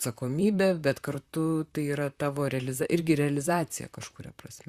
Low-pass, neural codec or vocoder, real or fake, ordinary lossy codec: 14.4 kHz; vocoder, 44.1 kHz, 128 mel bands, Pupu-Vocoder; fake; Opus, 64 kbps